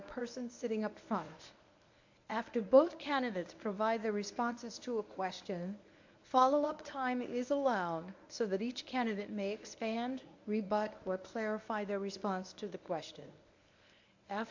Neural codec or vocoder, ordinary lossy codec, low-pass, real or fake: codec, 24 kHz, 0.9 kbps, WavTokenizer, medium speech release version 1; AAC, 48 kbps; 7.2 kHz; fake